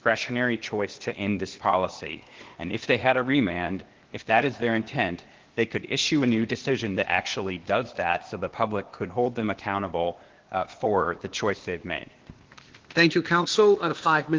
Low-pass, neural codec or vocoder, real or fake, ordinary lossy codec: 7.2 kHz; codec, 16 kHz, 0.8 kbps, ZipCodec; fake; Opus, 16 kbps